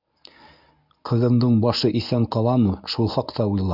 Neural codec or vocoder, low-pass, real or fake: codec, 24 kHz, 0.9 kbps, WavTokenizer, medium speech release version 1; 5.4 kHz; fake